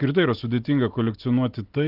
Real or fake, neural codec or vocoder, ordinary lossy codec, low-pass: real; none; Opus, 32 kbps; 5.4 kHz